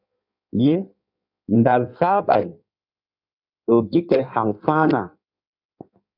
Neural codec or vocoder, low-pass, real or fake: codec, 16 kHz in and 24 kHz out, 1.1 kbps, FireRedTTS-2 codec; 5.4 kHz; fake